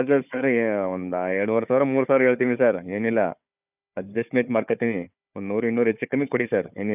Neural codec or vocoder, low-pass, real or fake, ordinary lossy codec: codec, 16 kHz, 4 kbps, FunCodec, trained on Chinese and English, 50 frames a second; 3.6 kHz; fake; none